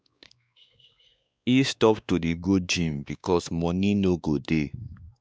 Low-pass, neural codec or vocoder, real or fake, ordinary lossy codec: none; codec, 16 kHz, 2 kbps, X-Codec, WavLM features, trained on Multilingual LibriSpeech; fake; none